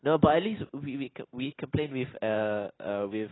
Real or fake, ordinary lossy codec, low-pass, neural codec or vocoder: real; AAC, 16 kbps; 7.2 kHz; none